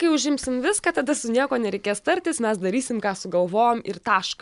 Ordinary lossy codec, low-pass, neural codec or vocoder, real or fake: MP3, 96 kbps; 10.8 kHz; none; real